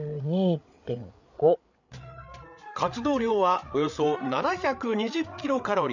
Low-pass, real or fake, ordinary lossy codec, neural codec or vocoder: 7.2 kHz; fake; none; codec, 16 kHz, 8 kbps, FreqCodec, larger model